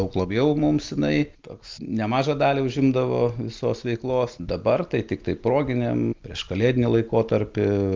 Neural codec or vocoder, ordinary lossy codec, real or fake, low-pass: none; Opus, 32 kbps; real; 7.2 kHz